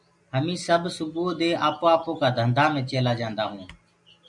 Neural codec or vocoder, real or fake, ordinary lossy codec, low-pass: none; real; MP3, 64 kbps; 10.8 kHz